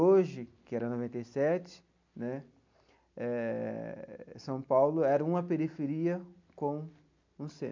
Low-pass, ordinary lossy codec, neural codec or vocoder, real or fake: 7.2 kHz; MP3, 64 kbps; none; real